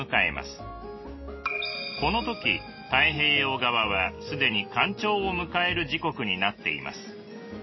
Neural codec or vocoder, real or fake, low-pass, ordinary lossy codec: none; real; 7.2 kHz; MP3, 24 kbps